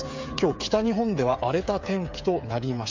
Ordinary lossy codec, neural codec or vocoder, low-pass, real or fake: MP3, 64 kbps; codec, 16 kHz, 8 kbps, FreqCodec, smaller model; 7.2 kHz; fake